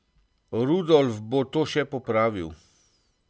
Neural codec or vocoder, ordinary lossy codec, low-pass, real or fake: none; none; none; real